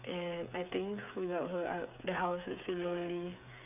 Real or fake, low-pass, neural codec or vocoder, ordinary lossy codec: fake; 3.6 kHz; codec, 16 kHz, 8 kbps, FreqCodec, smaller model; none